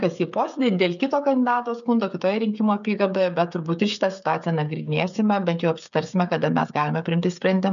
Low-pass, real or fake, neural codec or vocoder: 7.2 kHz; fake; codec, 16 kHz, 16 kbps, FreqCodec, smaller model